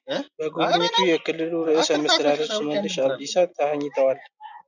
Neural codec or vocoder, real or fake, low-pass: none; real; 7.2 kHz